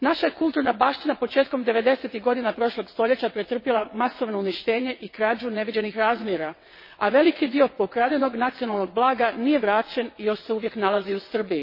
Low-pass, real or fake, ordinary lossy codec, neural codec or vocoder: 5.4 kHz; fake; MP3, 24 kbps; vocoder, 22.05 kHz, 80 mel bands, WaveNeXt